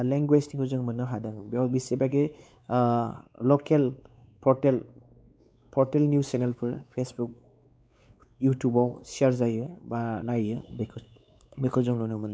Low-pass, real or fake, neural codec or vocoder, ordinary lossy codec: none; fake; codec, 16 kHz, 4 kbps, X-Codec, WavLM features, trained on Multilingual LibriSpeech; none